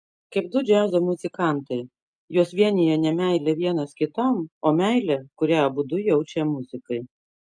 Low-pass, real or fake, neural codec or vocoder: 9.9 kHz; real; none